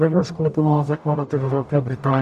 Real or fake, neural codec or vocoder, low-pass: fake; codec, 44.1 kHz, 0.9 kbps, DAC; 14.4 kHz